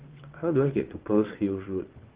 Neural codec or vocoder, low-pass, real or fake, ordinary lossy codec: vocoder, 22.05 kHz, 80 mel bands, WaveNeXt; 3.6 kHz; fake; Opus, 16 kbps